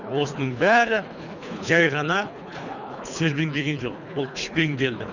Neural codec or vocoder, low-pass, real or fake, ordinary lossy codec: codec, 24 kHz, 3 kbps, HILCodec; 7.2 kHz; fake; none